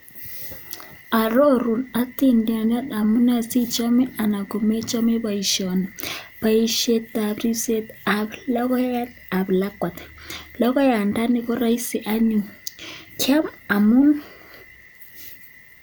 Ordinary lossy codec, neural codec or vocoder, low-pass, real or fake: none; none; none; real